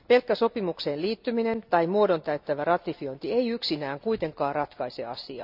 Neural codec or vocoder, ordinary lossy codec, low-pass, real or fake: none; none; 5.4 kHz; real